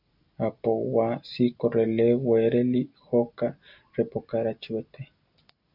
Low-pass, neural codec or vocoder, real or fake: 5.4 kHz; none; real